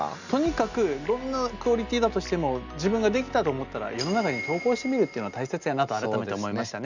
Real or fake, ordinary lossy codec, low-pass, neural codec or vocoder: real; none; 7.2 kHz; none